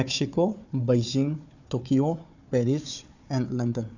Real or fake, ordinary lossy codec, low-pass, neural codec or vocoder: fake; none; 7.2 kHz; codec, 16 kHz, 4 kbps, FunCodec, trained on Chinese and English, 50 frames a second